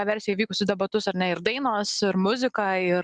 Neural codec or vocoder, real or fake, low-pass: none; real; 9.9 kHz